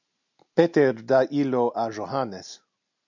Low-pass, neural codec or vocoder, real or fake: 7.2 kHz; none; real